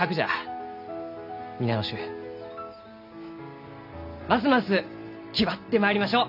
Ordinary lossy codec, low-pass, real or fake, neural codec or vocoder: none; 5.4 kHz; real; none